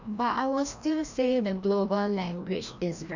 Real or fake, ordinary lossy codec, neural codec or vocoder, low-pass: fake; none; codec, 16 kHz, 1 kbps, FreqCodec, larger model; 7.2 kHz